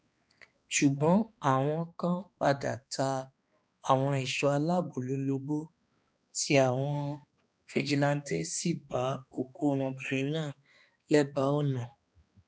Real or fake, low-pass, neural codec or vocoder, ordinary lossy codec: fake; none; codec, 16 kHz, 2 kbps, X-Codec, HuBERT features, trained on balanced general audio; none